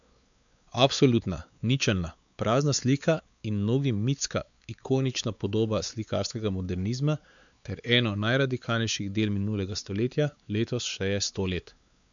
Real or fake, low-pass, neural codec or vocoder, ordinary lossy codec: fake; 7.2 kHz; codec, 16 kHz, 4 kbps, X-Codec, WavLM features, trained on Multilingual LibriSpeech; none